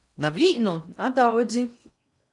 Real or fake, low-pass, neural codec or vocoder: fake; 10.8 kHz; codec, 16 kHz in and 24 kHz out, 0.6 kbps, FocalCodec, streaming, 4096 codes